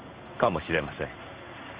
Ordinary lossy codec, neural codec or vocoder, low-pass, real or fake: Opus, 24 kbps; codec, 16 kHz in and 24 kHz out, 1 kbps, XY-Tokenizer; 3.6 kHz; fake